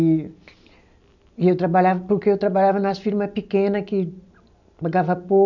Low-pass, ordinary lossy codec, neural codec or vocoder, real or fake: 7.2 kHz; none; none; real